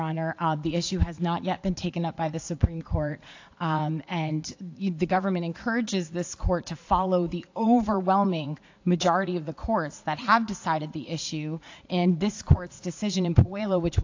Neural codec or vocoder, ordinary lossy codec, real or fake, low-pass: vocoder, 44.1 kHz, 80 mel bands, Vocos; AAC, 48 kbps; fake; 7.2 kHz